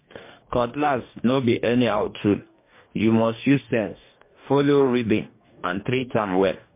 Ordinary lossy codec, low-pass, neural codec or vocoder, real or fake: MP3, 24 kbps; 3.6 kHz; codec, 44.1 kHz, 2.6 kbps, DAC; fake